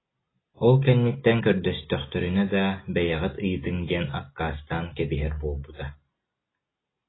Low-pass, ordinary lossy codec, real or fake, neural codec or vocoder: 7.2 kHz; AAC, 16 kbps; real; none